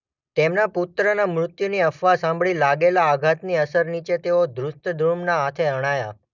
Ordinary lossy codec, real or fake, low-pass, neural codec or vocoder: none; real; 7.2 kHz; none